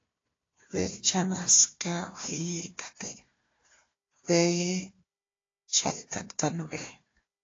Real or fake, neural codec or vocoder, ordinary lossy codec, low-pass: fake; codec, 16 kHz, 1 kbps, FunCodec, trained on Chinese and English, 50 frames a second; AAC, 32 kbps; 7.2 kHz